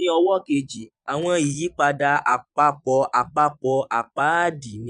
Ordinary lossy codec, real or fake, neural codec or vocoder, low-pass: none; fake; vocoder, 22.05 kHz, 80 mel bands, Vocos; 9.9 kHz